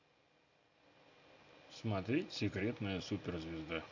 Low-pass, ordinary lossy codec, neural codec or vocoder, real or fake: 7.2 kHz; AAC, 48 kbps; none; real